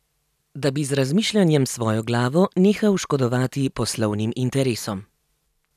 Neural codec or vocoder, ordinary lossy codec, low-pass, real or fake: vocoder, 44.1 kHz, 128 mel bands every 256 samples, BigVGAN v2; none; 14.4 kHz; fake